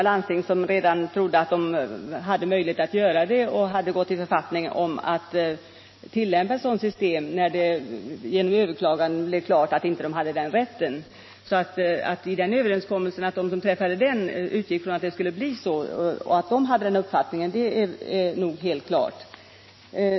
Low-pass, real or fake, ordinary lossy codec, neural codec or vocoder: 7.2 kHz; real; MP3, 24 kbps; none